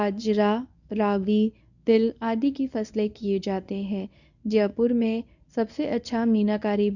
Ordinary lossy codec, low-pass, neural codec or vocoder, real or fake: none; 7.2 kHz; codec, 24 kHz, 0.9 kbps, WavTokenizer, medium speech release version 1; fake